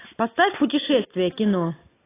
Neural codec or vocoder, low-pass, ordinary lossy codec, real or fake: none; 3.6 kHz; AAC, 16 kbps; real